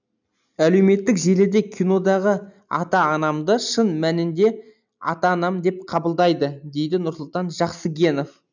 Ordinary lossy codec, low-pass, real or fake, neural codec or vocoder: none; 7.2 kHz; real; none